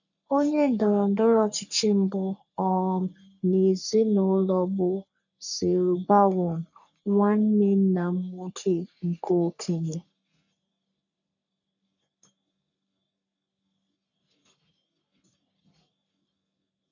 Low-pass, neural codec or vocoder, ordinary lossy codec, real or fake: 7.2 kHz; codec, 44.1 kHz, 3.4 kbps, Pupu-Codec; none; fake